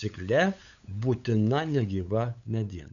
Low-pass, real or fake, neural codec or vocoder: 7.2 kHz; fake; codec, 16 kHz, 8 kbps, FunCodec, trained on LibriTTS, 25 frames a second